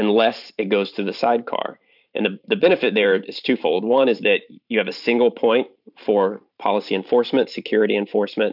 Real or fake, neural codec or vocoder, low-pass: fake; vocoder, 44.1 kHz, 128 mel bands every 512 samples, BigVGAN v2; 5.4 kHz